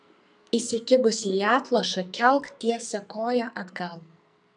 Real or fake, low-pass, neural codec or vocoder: fake; 10.8 kHz; codec, 44.1 kHz, 2.6 kbps, SNAC